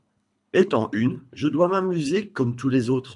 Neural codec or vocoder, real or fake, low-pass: codec, 24 kHz, 3 kbps, HILCodec; fake; 10.8 kHz